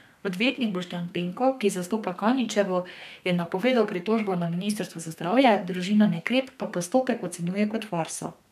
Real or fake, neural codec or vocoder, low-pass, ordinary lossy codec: fake; codec, 32 kHz, 1.9 kbps, SNAC; 14.4 kHz; none